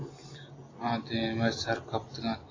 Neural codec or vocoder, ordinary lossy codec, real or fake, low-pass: none; AAC, 32 kbps; real; 7.2 kHz